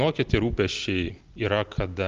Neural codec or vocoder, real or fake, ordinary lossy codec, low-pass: none; real; Opus, 32 kbps; 7.2 kHz